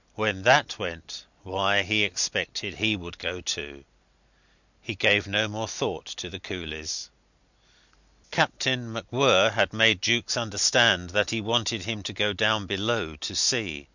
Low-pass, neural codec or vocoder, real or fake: 7.2 kHz; none; real